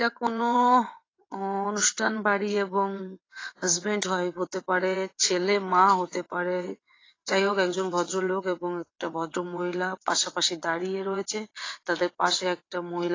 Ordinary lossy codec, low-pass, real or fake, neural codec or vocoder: AAC, 32 kbps; 7.2 kHz; fake; vocoder, 22.05 kHz, 80 mel bands, WaveNeXt